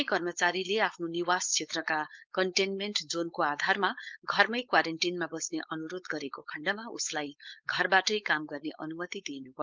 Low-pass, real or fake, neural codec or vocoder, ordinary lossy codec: 7.2 kHz; fake; codec, 16 kHz, 4.8 kbps, FACodec; Opus, 32 kbps